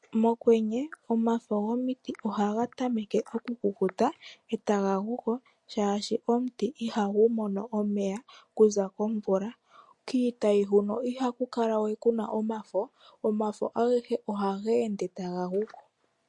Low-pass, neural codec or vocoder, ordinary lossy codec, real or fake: 10.8 kHz; none; MP3, 48 kbps; real